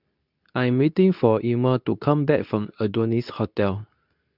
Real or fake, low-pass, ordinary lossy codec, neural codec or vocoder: fake; 5.4 kHz; none; codec, 24 kHz, 0.9 kbps, WavTokenizer, medium speech release version 2